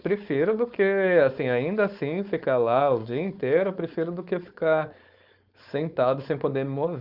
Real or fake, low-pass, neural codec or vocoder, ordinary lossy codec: fake; 5.4 kHz; codec, 16 kHz, 4.8 kbps, FACodec; Opus, 64 kbps